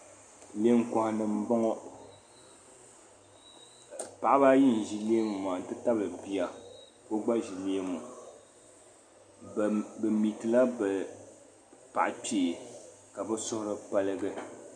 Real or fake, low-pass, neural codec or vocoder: real; 9.9 kHz; none